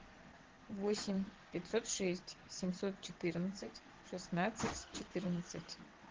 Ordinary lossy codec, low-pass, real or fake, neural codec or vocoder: Opus, 16 kbps; 7.2 kHz; fake; vocoder, 22.05 kHz, 80 mel bands, WaveNeXt